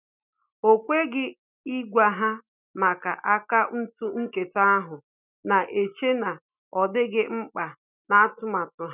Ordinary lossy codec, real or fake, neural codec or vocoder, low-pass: none; real; none; 3.6 kHz